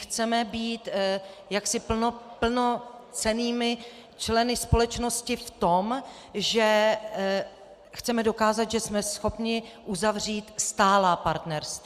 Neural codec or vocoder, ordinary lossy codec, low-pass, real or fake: none; Opus, 64 kbps; 14.4 kHz; real